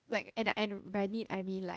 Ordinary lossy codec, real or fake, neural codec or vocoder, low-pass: none; fake; codec, 16 kHz, 0.8 kbps, ZipCodec; none